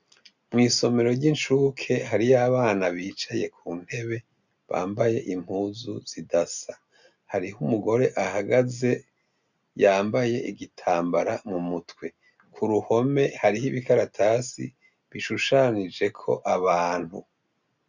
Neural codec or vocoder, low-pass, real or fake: none; 7.2 kHz; real